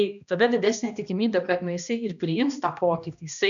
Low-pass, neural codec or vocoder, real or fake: 7.2 kHz; codec, 16 kHz, 1 kbps, X-Codec, HuBERT features, trained on balanced general audio; fake